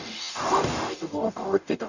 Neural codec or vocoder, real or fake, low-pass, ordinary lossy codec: codec, 44.1 kHz, 0.9 kbps, DAC; fake; 7.2 kHz; none